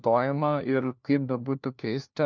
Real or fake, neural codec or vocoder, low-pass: fake; codec, 16 kHz, 1 kbps, FunCodec, trained on LibriTTS, 50 frames a second; 7.2 kHz